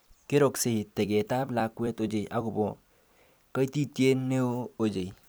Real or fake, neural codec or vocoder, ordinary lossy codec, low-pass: fake; vocoder, 44.1 kHz, 128 mel bands every 256 samples, BigVGAN v2; none; none